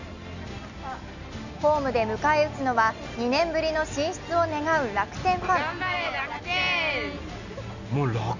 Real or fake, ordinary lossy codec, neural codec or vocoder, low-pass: real; none; none; 7.2 kHz